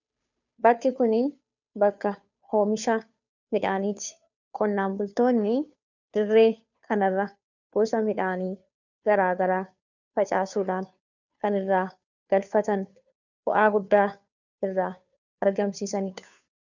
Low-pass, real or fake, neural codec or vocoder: 7.2 kHz; fake; codec, 16 kHz, 2 kbps, FunCodec, trained on Chinese and English, 25 frames a second